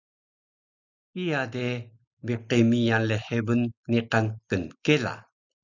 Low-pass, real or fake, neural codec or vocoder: 7.2 kHz; real; none